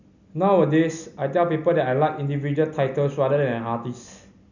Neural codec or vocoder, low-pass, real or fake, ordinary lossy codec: none; 7.2 kHz; real; none